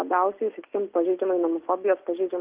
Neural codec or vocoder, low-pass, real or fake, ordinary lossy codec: none; 3.6 kHz; real; Opus, 32 kbps